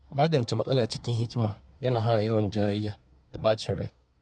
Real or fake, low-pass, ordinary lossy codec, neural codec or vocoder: fake; 9.9 kHz; none; codec, 24 kHz, 1 kbps, SNAC